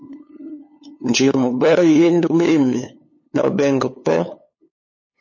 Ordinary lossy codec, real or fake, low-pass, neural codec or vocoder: MP3, 32 kbps; fake; 7.2 kHz; codec, 16 kHz, 2 kbps, FunCodec, trained on LibriTTS, 25 frames a second